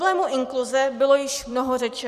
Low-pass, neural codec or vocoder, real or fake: 14.4 kHz; vocoder, 44.1 kHz, 128 mel bands every 256 samples, BigVGAN v2; fake